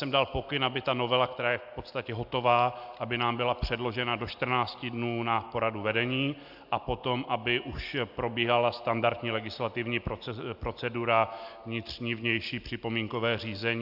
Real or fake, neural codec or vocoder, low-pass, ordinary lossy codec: real; none; 5.4 kHz; AAC, 48 kbps